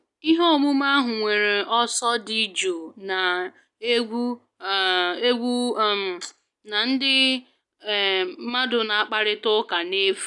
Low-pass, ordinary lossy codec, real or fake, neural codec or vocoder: 10.8 kHz; none; real; none